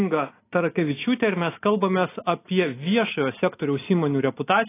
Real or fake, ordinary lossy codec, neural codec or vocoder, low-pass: real; AAC, 24 kbps; none; 3.6 kHz